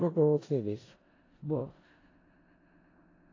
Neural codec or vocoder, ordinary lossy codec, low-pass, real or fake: codec, 16 kHz in and 24 kHz out, 0.4 kbps, LongCat-Audio-Codec, four codebook decoder; AAC, 32 kbps; 7.2 kHz; fake